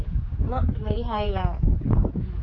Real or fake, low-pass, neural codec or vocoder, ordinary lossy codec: fake; 7.2 kHz; codec, 16 kHz, 4 kbps, X-Codec, HuBERT features, trained on general audio; none